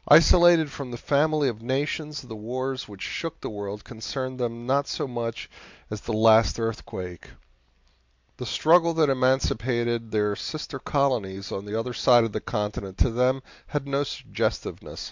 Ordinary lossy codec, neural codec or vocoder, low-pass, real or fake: MP3, 64 kbps; none; 7.2 kHz; real